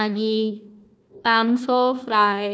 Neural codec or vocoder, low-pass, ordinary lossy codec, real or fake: codec, 16 kHz, 1 kbps, FunCodec, trained on Chinese and English, 50 frames a second; none; none; fake